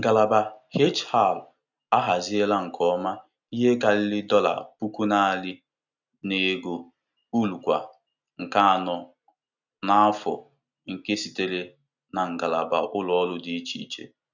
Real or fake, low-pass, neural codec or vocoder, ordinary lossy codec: real; 7.2 kHz; none; none